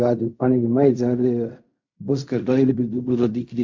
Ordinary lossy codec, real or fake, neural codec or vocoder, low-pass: MP3, 48 kbps; fake; codec, 16 kHz in and 24 kHz out, 0.4 kbps, LongCat-Audio-Codec, fine tuned four codebook decoder; 7.2 kHz